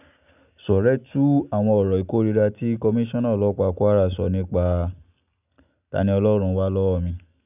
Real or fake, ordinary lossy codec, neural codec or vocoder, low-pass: real; none; none; 3.6 kHz